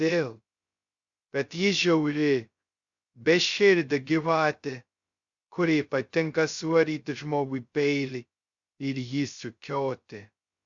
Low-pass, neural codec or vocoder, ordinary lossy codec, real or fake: 7.2 kHz; codec, 16 kHz, 0.2 kbps, FocalCodec; Opus, 64 kbps; fake